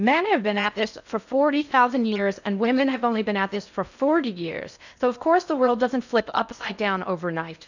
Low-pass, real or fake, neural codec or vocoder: 7.2 kHz; fake; codec, 16 kHz in and 24 kHz out, 0.6 kbps, FocalCodec, streaming, 4096 codes